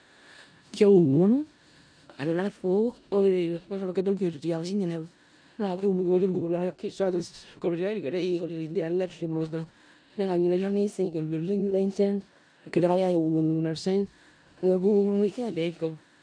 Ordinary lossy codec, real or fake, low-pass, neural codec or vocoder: none; fake; 9.9 kHz; codec, 16 kHz in and 24 kHz out, 0.4 kbps, LongCat-Audio-Codec, four codebook decoder